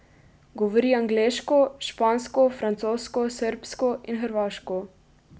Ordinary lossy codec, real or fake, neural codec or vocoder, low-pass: none; real; none; none